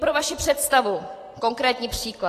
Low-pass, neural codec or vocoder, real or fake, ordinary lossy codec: 14.4 kHz; vocoder, 44.1 kHz, 128 mel bands every 512 samples, BigVGAN v2; fake; AAC, 48 kbps